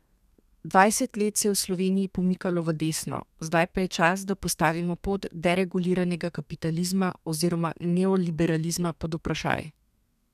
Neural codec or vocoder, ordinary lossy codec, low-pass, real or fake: codec, 32 kHz, 1.9 kbps, SNAC; none; 14.4 kHz; fake